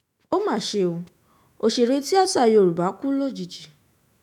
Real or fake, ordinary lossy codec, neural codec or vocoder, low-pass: fake; none; autoencoder, 48 kHz, 128 numbers a frame, DAC-VAE, trained on Japanese speech; 19.8 kHz